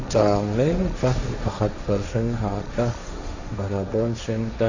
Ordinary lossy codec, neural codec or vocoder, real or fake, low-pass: Opus, 64 kbps; codec, 16 kHz, 1.1 kbps, Voila-Tokenizer; fake; 7.2 kHz